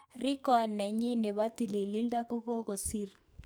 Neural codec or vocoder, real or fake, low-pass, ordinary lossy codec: codec, 44.1 kHz, 2.6 kbps, SNAC; fake; none; none